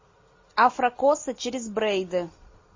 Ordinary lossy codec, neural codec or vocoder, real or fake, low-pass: MP3, 32 kbps; none; real; 7.2 kHz